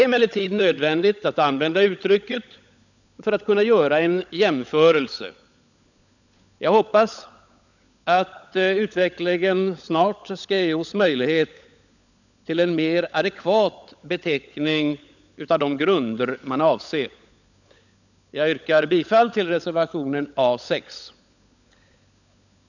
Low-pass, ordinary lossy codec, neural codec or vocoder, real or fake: 7.2 kHz; none; codec, 16 kHz, 16 kbps, FunCodec, trained on LibriTTS, 50 frames a second; fake